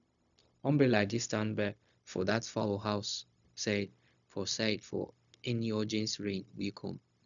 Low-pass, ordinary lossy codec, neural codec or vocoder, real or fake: 7.2 kHz; none; codec, 16 kHz, 0.4 kbps, LongCat-Audio-Codec; fake